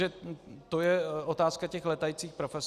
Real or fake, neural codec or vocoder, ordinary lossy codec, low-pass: real; none; Opus, 64 kbps; 14.4 kHz